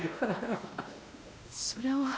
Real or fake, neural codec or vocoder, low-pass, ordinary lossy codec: fake; codec, 16 kHz, 1 kbps, X-Codec, WavLM features, trained on Multilingual LibriSpeech; none; none